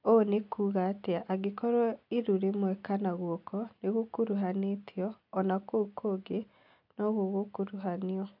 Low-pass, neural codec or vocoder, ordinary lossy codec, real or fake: 5.4 kHz; none; none; real